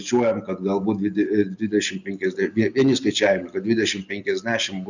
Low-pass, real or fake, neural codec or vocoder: 7.2 kHz; real; none